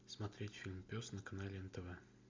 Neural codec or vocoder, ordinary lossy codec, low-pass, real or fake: none; MP3, 64 kbps; 7.2 kHz; real